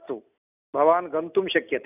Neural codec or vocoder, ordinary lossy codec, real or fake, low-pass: none; none; real; 3.6 kHz